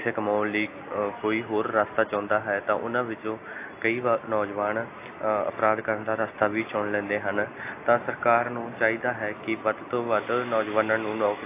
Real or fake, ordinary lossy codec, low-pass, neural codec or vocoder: real; AAC, 24 kbps; 3.6 kHz; none